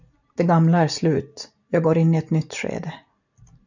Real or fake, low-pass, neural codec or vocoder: real; 7.2 kHz; none